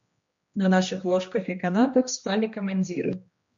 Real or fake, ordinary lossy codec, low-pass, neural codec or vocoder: fake; MP3, 48 kbps; 7.2 kHz; codec, 16 kHz, 1 kbps, X-Codec, HuBERT features, trained on general audio